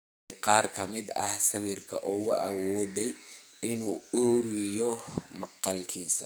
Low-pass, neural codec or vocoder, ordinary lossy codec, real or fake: none; codec, 44.1 kHz, 2.6 kbps, SNAC; none; fake